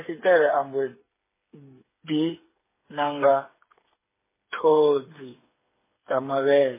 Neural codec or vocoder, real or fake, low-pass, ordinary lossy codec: codec, 16 kHz, 8 kbps, FreqCodec, smaller model; fake; 3.6 kHz; MP3, 16 kbps